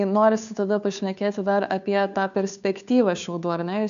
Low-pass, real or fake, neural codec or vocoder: 7.2 kHz; fake; codec, 16 kHz, 2 kbps, FunCodec, trained on Chinese and English, 25 frames a second